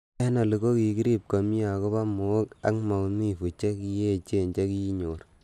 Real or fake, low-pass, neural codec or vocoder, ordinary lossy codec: real; 14.4 kHz; none; MP3, 96 kbps